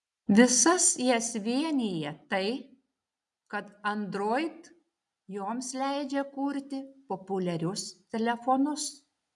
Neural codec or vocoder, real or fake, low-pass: none; real; 10.8 kHz